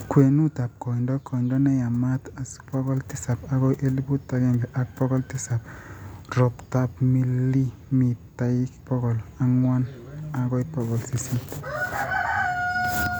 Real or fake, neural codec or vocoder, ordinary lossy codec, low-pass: real; none; none; none